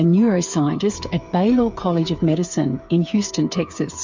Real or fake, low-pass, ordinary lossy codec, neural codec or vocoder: fake; 7.2 kHz; MP3, 64 kbps; codec, 16 kHz, 8 kbps, FreqCodec, smaller model